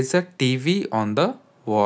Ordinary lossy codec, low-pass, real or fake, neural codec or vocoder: none; none; real; none